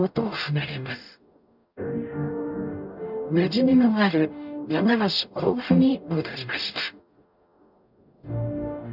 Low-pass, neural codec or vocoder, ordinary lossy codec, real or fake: 5.4 kHz; codec, 44.1 kHz, 0.9 kbps, DAC; none; fake